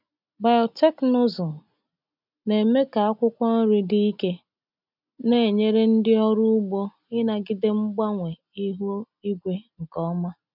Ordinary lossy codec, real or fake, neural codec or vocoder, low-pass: none; real; none; 5.4 kHz